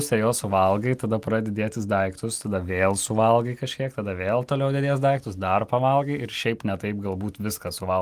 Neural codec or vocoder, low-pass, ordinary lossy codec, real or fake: none; 14.4 kHz; Opus, 32 kbps; real